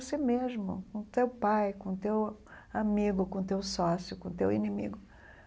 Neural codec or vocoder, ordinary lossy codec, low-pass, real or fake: none; none; none; real